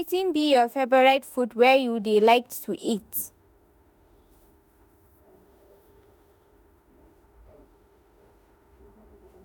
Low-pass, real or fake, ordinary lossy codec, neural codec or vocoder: none; fake; none; autoencoder, 48 kHz, 32 numbers a frame, DAC-VAE, trained on Japanese speech